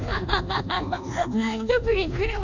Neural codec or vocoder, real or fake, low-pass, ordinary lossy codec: codec, 24 kHz, 1.2 kbps, DualCodec; fake; 7.2 kHz; none